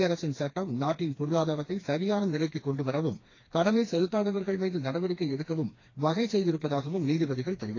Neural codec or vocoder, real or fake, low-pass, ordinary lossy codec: codec, 16 kHz, 2 kbps, FreqCodec, smaller model; fake; 7.2 kHz; AAC, 32 kbps